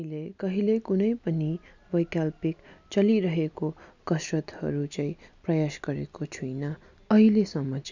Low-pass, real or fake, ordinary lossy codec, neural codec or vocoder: 7.2 kHz; real; none; none